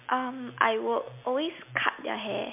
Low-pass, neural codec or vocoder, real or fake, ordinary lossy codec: 3.6 kHz; none; real; MP3, 32 kbps